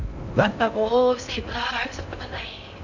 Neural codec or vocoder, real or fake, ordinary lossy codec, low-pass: codec, 16 kHz in and 24 kHz out, 0.6 kbps, FocalCodec, streaming, 4096 codes; fake; none; 7.2 kHz